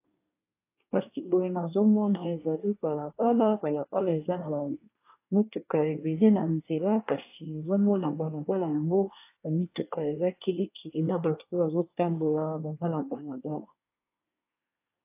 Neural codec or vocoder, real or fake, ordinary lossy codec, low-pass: codec, 24 kHz, 1 kbps, SNAC; fake; AAC, 24 kbps; 3.6 kHz